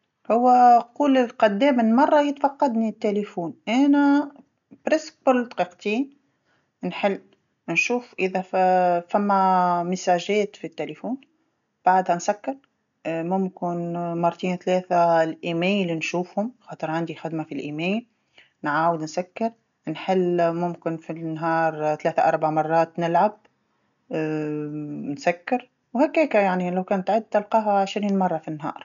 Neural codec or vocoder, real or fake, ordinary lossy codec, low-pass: none; real; none; 7.2 kHz